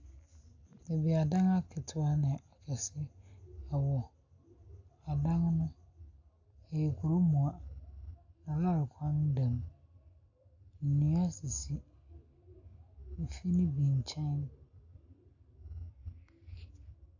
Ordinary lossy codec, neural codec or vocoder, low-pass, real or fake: AAC, 48 kbps; none; 7.2 kHz; real